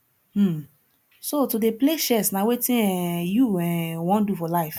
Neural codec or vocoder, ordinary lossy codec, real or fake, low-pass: none; none; real; 19.8 kHz